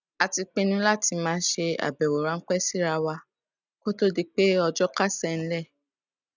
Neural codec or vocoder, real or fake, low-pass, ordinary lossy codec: none; real; 7.2 kHz; none